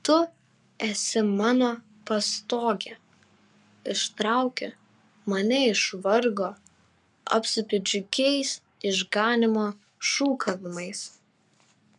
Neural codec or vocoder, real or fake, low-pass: codec, 44.1 kHz, 7.8 kbps, Pupu-Codec; fake; 10.8 kHz